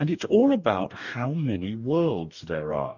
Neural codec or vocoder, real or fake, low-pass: codec, 44.1 kHz, 2.6 kbps, DAC; fake; 7.2 kHz